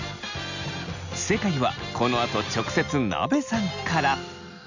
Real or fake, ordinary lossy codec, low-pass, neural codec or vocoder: real; none; 7.2 kHz; none